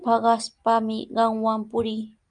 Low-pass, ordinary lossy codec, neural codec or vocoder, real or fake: 9.9 kHz; Opus, 32 kbps; none; real